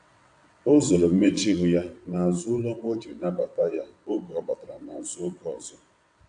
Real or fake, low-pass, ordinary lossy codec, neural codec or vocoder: fake; 9.9 kHz; AAC, 64 kbps; vocoder, 22.05 kHz, 80 mel bands, WaveNeXt